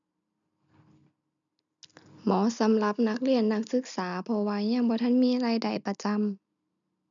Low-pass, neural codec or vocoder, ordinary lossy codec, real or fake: 7.2 kHz; none; MP3, 96 kbps; real